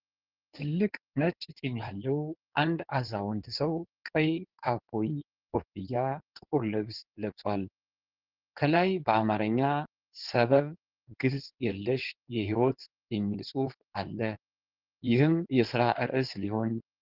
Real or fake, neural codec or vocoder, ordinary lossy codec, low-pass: fake; codec, 16 kHz in and 24 kHz out, 2.2 kbps, FireRedTTS-2 codec; Opus, 16 kbps; 5.4 kHz